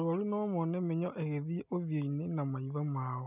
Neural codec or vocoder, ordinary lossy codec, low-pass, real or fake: none; none; 3.6 kHz; real